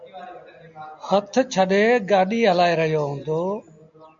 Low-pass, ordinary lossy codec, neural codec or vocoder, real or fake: 7.2 kHz; MP3, 64 kbps; none; real